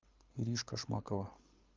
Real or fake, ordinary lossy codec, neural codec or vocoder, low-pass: fake; Opus, 32 kbps; codec, 24 kHz, 6 kbps, HILCodec; 7.2 kHz